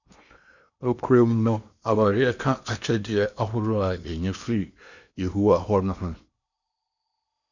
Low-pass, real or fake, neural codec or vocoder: 7.2 kHz; fake; codec, 16 kHz in and 24 kHz out, 0.8 kbps, FocalCodec, streaming, 65536 codes